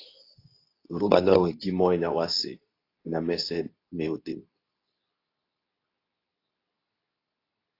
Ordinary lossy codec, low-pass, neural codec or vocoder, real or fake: AAC, 32 kbps; 5.4 kHz; codec, 24 kHz, 0.9 kbps, WavTokenizer, medium speech release version 2; fake